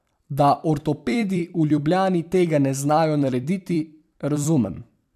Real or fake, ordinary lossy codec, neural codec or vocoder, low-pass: fake; MP3, 96 kbps; vocoder, 44.1 kHz, 128 mel bands every 256 samples, BigVGAN v2; 14.4 kHz